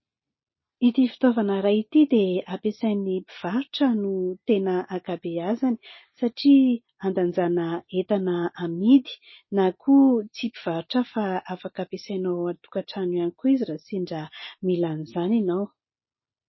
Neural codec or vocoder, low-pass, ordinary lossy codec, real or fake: none; 7.2 kHz; MP3, 24 kbps; real